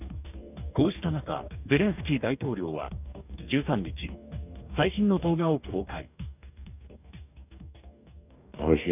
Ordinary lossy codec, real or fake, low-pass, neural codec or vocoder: none; fake; 3.6 kHz; codec, 44.1 kHz, 2.6 kbps, DAC